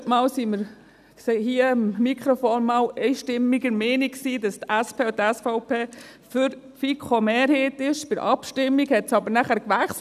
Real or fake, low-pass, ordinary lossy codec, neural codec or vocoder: real; 14.4 kHz; none; none